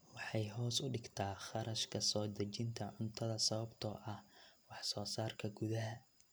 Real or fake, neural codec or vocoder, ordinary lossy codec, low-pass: real; none; none; none